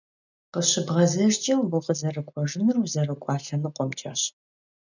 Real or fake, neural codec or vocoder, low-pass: real; none; 7.2 kHz